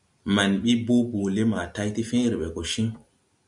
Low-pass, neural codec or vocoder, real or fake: 10.8 kHz; none; real